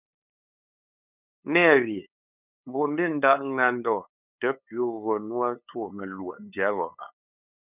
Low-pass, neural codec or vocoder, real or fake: 3.6 kHz; codec, 16 kHz, 8 kbps, FunCodec, trained on LibriTTS, 25 frames a second; fake